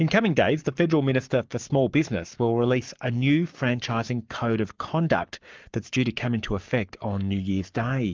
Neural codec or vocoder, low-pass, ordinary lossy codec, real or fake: codec, 44.1 kHz, 7.8 kbps, Pupu-Codec; 7.2 kHz; Opus, 24 kbps; fake